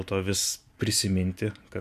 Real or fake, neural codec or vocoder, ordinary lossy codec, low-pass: real; none; MP3, 96 kbps; 14.4 kHz